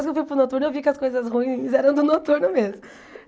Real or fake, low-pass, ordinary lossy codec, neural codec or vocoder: real; none; none; none